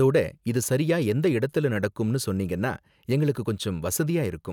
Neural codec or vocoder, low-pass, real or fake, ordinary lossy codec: none; 19.8 kHz; real; none